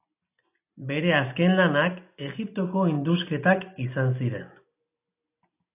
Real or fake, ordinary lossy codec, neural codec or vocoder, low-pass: real; AAC, 24 kbps; none; 3.6 kHz